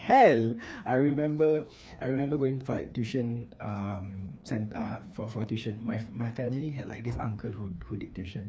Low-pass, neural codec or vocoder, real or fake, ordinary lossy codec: none; codec, 16 kHz, 2 kbps, FreqCodec, larger model; fake; none